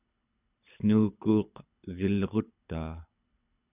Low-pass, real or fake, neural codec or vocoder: 3.6 kHz; fake; codec, 24 kHz, 6 kbps, HILCodec